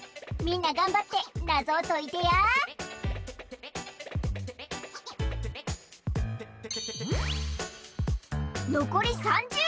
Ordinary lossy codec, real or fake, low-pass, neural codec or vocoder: none; real; none; none